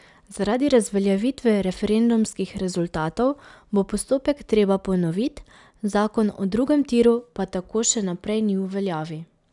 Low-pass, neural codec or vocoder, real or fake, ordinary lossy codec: 10.8 kHz; none; real; none